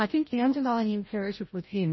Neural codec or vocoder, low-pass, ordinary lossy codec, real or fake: codec, 16 kHz, 0.5 kbps, FreqCodec, larger model; 7.2 kHz; MP3, 24 kbps; fake